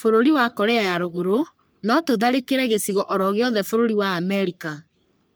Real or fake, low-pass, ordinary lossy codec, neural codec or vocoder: fake; none; none; codec, 44.1 kHz, 3.4 kbps, Pupu-Codec